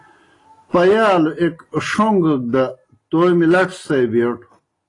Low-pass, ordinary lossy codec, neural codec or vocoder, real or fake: 10.8 kHz; AAC, 32 kbps; none; real